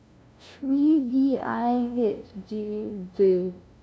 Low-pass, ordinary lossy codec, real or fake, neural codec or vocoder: none; none; fake; codec, 16 kHz, 0.5 kbps, FunCodec, trained on LibriTTS, 25 frames a second